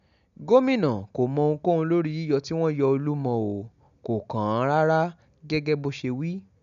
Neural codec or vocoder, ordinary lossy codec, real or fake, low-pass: none; none; real; 7.2 kHz